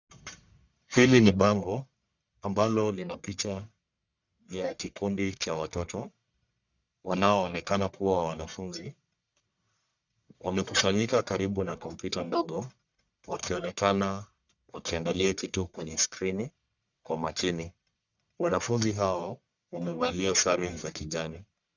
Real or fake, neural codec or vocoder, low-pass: fake; codec, 44.1 kHz, 1.7 kbps, Pupu-Codec; 7.2 kHz